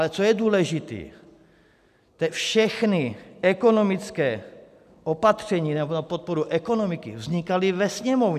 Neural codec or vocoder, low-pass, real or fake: none; 14.4 kHz; real